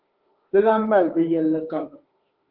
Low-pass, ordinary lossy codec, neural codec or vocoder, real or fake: 5.4 kHz; Opus, 32 kbps; autoencoder, 48 kHz, 32 numbers a frame, DAC-VAE, trained on Japanese speech; fake